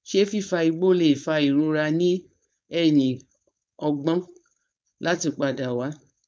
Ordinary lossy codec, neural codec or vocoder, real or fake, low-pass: none; codec, 16 kHz, 4.8 kbps, FACodec; fake; none